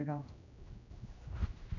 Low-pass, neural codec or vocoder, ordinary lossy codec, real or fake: 7.2 kHz; codec, 16 kHz, 1 kbps, X-Codec, HuBERT features, trained on general audio; none; fake